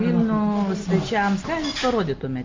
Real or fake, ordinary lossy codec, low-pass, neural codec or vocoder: real; Opus, 32 kbps; 7.2 kHz; none